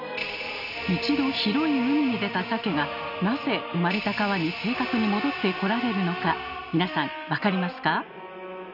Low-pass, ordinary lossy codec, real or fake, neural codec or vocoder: 5.4 kHz; none; real; none